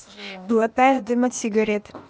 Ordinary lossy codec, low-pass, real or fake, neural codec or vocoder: none; none; fake; codec, 16 kHz, 0.8 kbps, ZipCodec